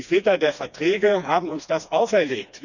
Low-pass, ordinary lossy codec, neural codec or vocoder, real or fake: 7.2 kHz; none; codec, 16 kHz, 2 kbps, FreqCodec, smaller model; fake